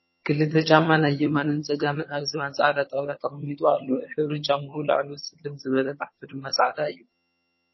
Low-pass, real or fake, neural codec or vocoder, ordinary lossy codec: 7.2 kHz; fake; vocoder, 22.05 kHz, 80 mel bands, HiFi-GAN; MP3, 24 kbps